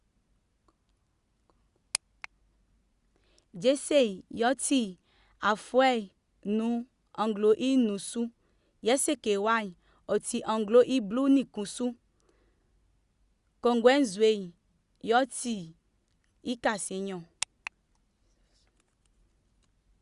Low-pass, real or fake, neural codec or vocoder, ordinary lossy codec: 10.8 kHz; real; none; Opus, 64 kbps